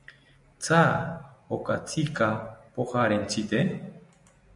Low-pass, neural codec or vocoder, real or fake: 10.8 kHz; none; real